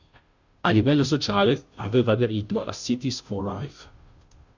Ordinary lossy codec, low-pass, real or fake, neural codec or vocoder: Opus, 64 kbps; 7.2 kHz; fake; codec, 16 kHz, 0.5 kbps, FunCodec, trained on Chinese and English, 25 frames a second